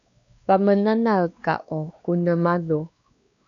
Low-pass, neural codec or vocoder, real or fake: 7.2 kHz; codec, 16 kHz, 2 kbps, X-Codec, WavLM features, trained on Multilingual LibriSpeech; fake